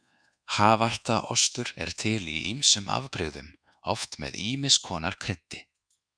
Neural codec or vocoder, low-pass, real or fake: codec, 24 kHz, 1.2 kbps, DualCodec; 9.9 kHz; fake